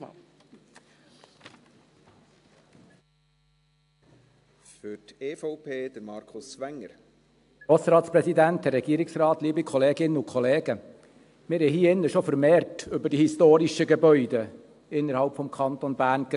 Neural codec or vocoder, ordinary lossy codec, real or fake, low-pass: none; AAC, 64 kbps; real; 10.8 kHz